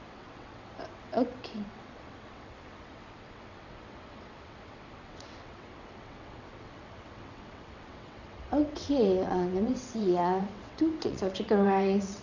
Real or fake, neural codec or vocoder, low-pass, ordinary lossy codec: fake; vocoder, 22.05 kHz, 80 mel bands, WaveNeXt; 7.2 kHz; none